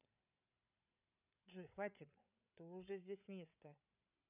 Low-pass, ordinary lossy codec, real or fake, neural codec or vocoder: 3.6 kHz; none; fake; codec, 16 kHz, 8 kbps, FreqCodec, larger model